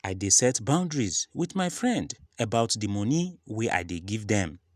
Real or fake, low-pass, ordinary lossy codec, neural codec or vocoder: real; 14.4 kHz; none; none